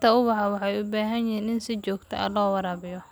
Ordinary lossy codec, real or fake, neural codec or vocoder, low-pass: none; real; none; none